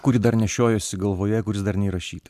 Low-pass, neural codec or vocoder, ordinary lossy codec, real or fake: 14.4 kHz; none; MP3, 96 kbps; real